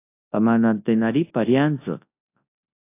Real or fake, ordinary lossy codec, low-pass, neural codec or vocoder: fake; AAC, 24 kbps; 3.6 kHz; codec, 24 kHz, 0.9 kbps, WavTokenizer, large speech release